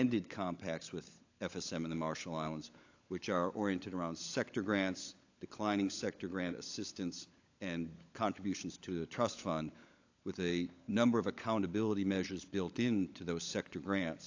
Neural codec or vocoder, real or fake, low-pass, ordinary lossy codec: codec, 16 kHz, 16 kbps, FunCodec, trained on LibriTTS, 50 frames a second; fake; 7.2 kHz; AAC, 48 kbps